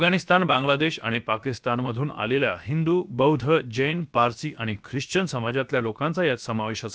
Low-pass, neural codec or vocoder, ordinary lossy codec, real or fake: none; codec, 16 kHz, about 1 kbps, DyCAST, with the encoder's durations; none; fake